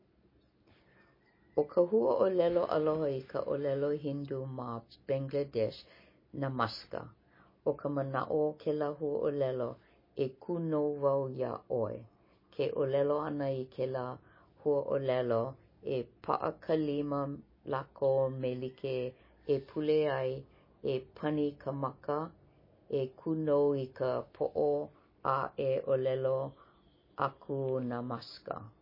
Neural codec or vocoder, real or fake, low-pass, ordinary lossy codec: none; real; 5.4 kHz; MP3, 24 kbps